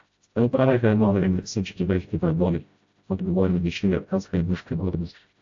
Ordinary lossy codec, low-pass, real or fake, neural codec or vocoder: AAC, 48 kbps; 7.2 kHz; fake; codec, 16 kHz, 0.5 kbps, FreqCodec, smaller model